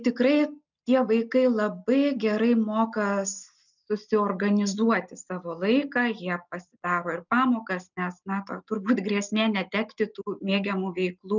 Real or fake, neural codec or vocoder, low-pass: real; none; 7.2 kHz